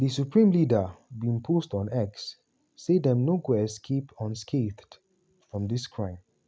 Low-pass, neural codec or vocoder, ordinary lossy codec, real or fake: none; none; none; real